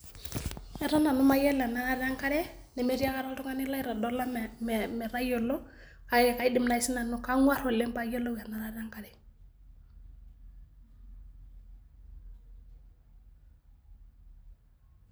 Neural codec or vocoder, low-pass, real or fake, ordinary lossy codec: none; none; real; none